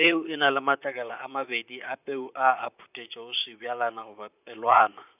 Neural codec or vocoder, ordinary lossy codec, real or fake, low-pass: vocoder, 44.1 kHz, 128 mel bands, Pupu-Vocoder; none; fake; 3.6 kHz